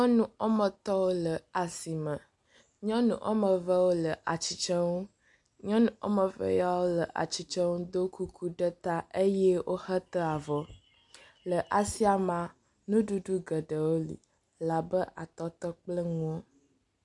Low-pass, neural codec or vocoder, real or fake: 10.8 kHz; none; real